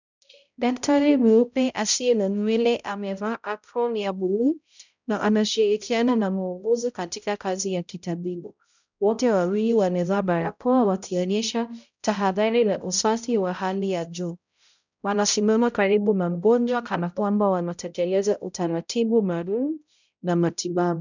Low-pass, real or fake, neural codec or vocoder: 7.2 kHz; fake; codec, 16 kHz, 0.5 kbps, X-Codec, HuBERT features, trained on balanced general audio